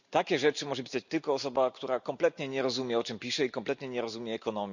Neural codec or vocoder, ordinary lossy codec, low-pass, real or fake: none; none; 7.2 kHz; real